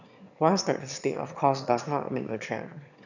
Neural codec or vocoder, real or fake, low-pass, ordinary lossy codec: autoencoder, 22.05 kHz, a latent of 192 numbers a frame, VITS, trained on one speaker; fake; 7.2 kHz; none